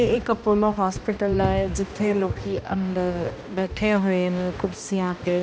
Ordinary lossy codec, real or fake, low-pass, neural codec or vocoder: none; fake; none; codec, 16 kHz, 1 kbps, X-Codec, HuBERT features, trained on balanced general audio